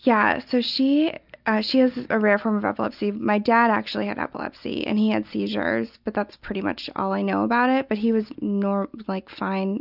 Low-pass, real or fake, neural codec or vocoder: 5.4 kHz; real; none